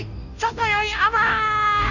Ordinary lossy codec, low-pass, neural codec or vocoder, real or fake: none; 7.2 kHz; codec, 16 kHz, 0.5 kbps, FunCodec, trained on Chinese and English, 25 frames a second; fake